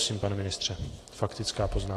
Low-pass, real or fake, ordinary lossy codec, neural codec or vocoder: 14.4 kHz; fake; AAC, 48 kbps; vocoder, 44.1 kHz, 128 mel bands every 512 samples, BigVGAN v2